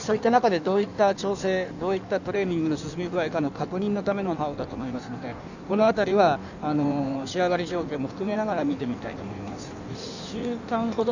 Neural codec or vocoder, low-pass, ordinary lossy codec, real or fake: codec, 16 kHz in and 24 kHz out, 1.1 kbps, FireRedTTS-2 codec; 7.2 kHz; none; fake